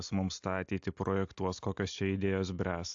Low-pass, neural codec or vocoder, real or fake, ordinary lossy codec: 7.2 kHz; none; real; AAC, 64 kbps